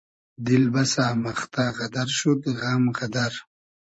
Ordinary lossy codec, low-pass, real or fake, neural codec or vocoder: MP3, 32 kbps; 10.8 kHz; fake; vocoder, 44.1 kHz, 128 mel bands every 512 samples, BigVGAN v2